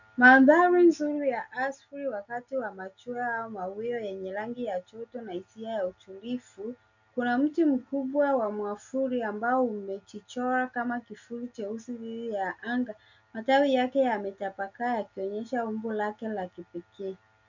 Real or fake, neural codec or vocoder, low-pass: real; none; 7.2 kHz